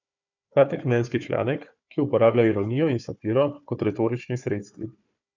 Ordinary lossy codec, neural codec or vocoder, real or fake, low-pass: none; codec, 16 kHz, 4 kbps, FunCodec, trained on Chinese and English, 50 frames a second; fake; 7.2 kHz